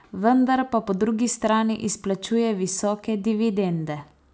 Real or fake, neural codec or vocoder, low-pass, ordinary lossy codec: real; none; none; none